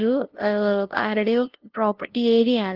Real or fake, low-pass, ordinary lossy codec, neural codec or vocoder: fake; 5.4 kHz; Opus, 16 kbps; codec, 16 kHz, 0.5 kbps, FunCodec, trained on LibriTTS, 25 frames a second